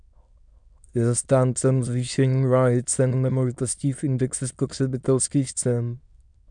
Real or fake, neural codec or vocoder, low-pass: fake; autoencoder, 22.05 kHz, a latent of 192 numbers a frame, VITS, trained on many speakers; 9.9 kHz